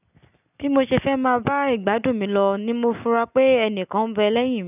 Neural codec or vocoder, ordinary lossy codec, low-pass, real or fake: none; none; 3.6 kHz; real